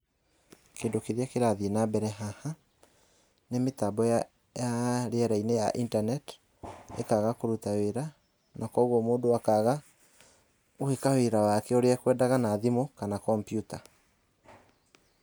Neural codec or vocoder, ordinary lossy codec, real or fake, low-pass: none; none; real; none